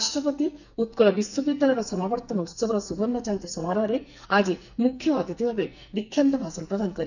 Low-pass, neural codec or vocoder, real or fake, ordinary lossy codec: 7.2 kHz; codec, 44.1 kHz, 2.6 kbps, SNAC; fake; none